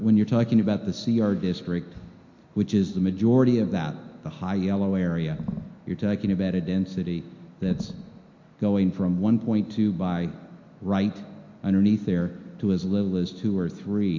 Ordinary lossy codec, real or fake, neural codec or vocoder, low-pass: MP3, 48 kbps; real; none; 7.2 kHz